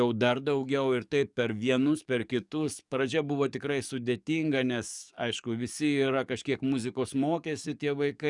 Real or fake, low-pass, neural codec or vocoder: fake; 10.8 kHz; codec, 44.1 kHz, 7.8 kbps, DAC